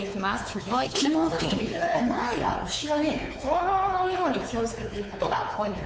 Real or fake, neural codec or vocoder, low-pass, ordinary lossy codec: fake; codec, 16 kHz, 4 kbps, X-Codec, WavLM features, trained on Multilingual LibriSpeech; none; none